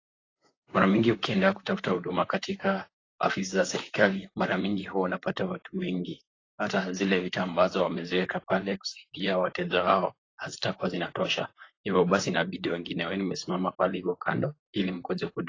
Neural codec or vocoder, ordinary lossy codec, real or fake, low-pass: vocoder, 44.1 kHz, 128 mel bands, Pupu-Vocoder; AAC, 32 kbps; fake; 7.2 kHz